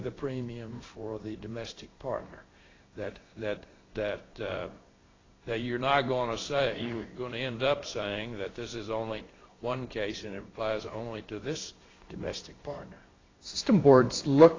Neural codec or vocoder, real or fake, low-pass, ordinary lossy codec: codec, 16 kHz in and 24 kHz out, 1 kbps, XY-Tokenizer; fake; 7.2 kHz; AAC, 32 kbps